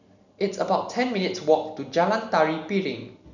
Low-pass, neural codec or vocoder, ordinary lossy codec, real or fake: 7.2 kHz; none; none; real